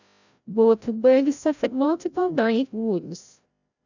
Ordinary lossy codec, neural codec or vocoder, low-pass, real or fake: none; codec, 16 kHz, 0.5 kbps, FreqCodec, larger model; 7.2 kHz; fake